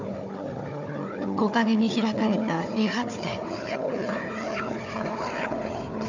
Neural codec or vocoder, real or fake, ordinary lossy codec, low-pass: codec, 16 kHz, 4 kbps, FunCodec, trained on Chinese and English, 50 frames a second; fake; none; 7.2 kHz